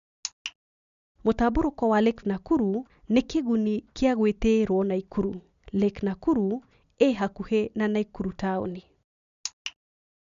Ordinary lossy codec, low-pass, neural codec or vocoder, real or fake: none; 7.2 kHz; none; real